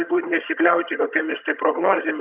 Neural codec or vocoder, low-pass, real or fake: vocoder, 22.05 kHz, 80 mel bands, HiFi-GAN; 3.6 kHz; fake